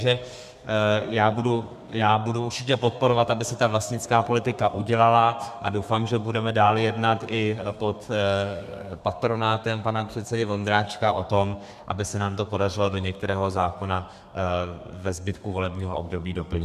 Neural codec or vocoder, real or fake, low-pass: codec, 32 kHz, 1.9 kbps, SNAC; fake; 14.4 kHz